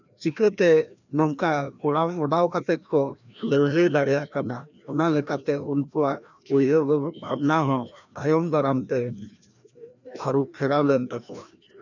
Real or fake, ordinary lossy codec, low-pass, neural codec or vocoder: fake; none; 7.2 kHz; codec, 16 kHz, 1 kbps, FreqCodec, larger model